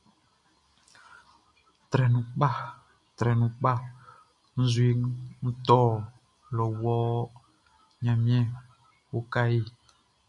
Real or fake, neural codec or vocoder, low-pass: real; none; 10.8 kHz